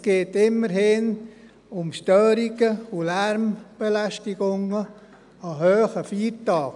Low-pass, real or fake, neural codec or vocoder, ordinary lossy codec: 10.8 kHz; real; none; none